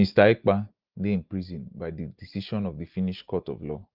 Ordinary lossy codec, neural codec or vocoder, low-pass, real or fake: Opus, 32 kbps; none; 5.4 kHz; real